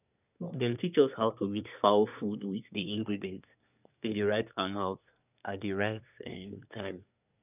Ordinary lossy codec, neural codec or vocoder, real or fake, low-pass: none; codec, 24 kHz, 1 kbps, SNAC; fake; 3.6 kHz